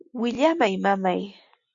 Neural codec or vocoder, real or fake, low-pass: none; real; 7.2 kHz